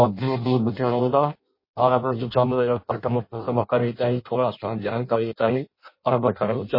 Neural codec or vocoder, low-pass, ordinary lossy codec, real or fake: codec, 16 kHz in and 24 kHz out, 0.6 kbps, FireRedTTS-2 codec; 5.4 kHz; MP3, 24 kbps; fake